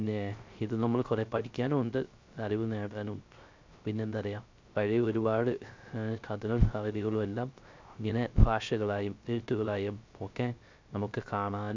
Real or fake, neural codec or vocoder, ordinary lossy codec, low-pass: fake; codec, 16 kHz, 0.3 kbps, FocalCodec; MP3, 64 kbps; 7.2 kHz